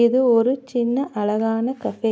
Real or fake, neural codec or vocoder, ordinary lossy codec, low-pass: real; none; none; none